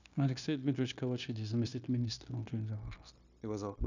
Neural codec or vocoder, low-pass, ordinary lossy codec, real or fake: codec, 16 kHz, 0.9 kbps, LongCat-Audio-Codec; 7.2 kHz; none; fake